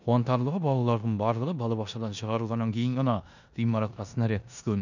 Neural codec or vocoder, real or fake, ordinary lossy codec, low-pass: codec, 16 kHz in and 24 kHz out, 0.9 kbps, LongCat-Audio-Codec, four codebook decoder; fake; none; 7.2 kHz